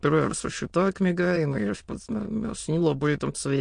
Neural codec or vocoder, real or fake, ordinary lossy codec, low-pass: autoencoder, 22.05 kHz, a latent of 192 numbers a frame, VITS, trained on many speakers; fake; MP3, 48 kbps; 9.9 kHz